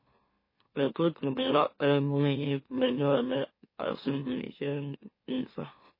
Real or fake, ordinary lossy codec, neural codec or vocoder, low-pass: fake; MP3, 24 kbps; autoencoder, 44.1 kHz, a latent of 192 numbers a frame, MeloTTS; 5.4 kHz